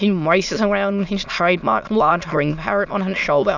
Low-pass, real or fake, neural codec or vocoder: 7.2 kHz; fake; autoencoder, 22.05 kHz, a latent of 192 numbers a frame, VITS, trained on many speakers